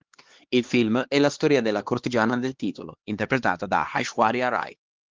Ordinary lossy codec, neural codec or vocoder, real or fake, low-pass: Opus, 16 kbps; codec, 16 kHz, 2 kbps, X-Codec, HuBERT features, trained on LibriSpeech; fake; 7.2 kHz